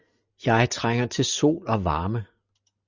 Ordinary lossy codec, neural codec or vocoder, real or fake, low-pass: Opus, 64 kbps; none; real; 7.2 kHz